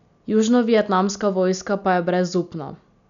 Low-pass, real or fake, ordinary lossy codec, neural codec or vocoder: 7.2 kHz; real; none; none